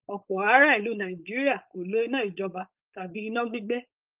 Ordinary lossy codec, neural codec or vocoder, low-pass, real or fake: Opus, 24 kbps; codec, 16 kHz, 4.8 kbps, FACodec; 3.6 kHz; fake